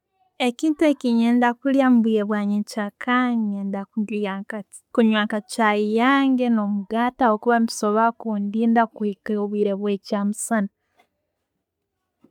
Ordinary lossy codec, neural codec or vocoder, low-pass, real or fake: none; none; 19.8 kHz; real